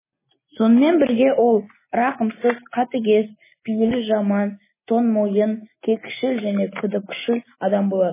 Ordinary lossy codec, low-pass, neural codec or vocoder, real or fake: MP3, 16 kbps; 3.6 kHz; none; real